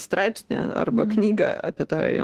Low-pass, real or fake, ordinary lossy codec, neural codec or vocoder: 14.4 kHz; fake; Opus, 16 kbps; autoencoder, 48 kHz, 32 numbers a frame, DAC-VAE, trained on Japanese speech